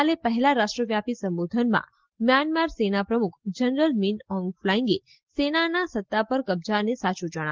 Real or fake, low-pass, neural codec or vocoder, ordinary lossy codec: real; 7.2 kHz; none; Opus, 16 kbps